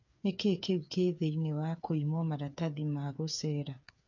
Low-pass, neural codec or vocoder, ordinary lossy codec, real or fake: 7.2 kHz; codec, 16 kHz, 8 kbps, FreqCodec, smaller model; none; fake